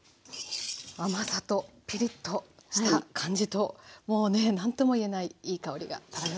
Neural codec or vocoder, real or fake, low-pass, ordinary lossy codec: none; real; none; none